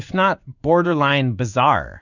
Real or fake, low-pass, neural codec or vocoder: fake; 7.2 kHz; codec, 16 kHz in and 24 kHz out, 1 kbps, XY-Tokenizer